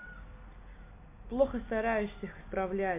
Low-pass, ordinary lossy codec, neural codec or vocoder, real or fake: 3.6 kHz; MP3, 24 kbps; none; real